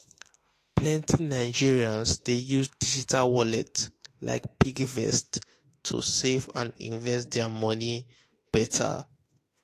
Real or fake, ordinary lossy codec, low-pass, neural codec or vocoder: fake; AAC, 48 kbps; 14.4 kHz; autoencoder, 48 kHz, 32 numbers a frame, DAC-VAE, trained on Japanese speech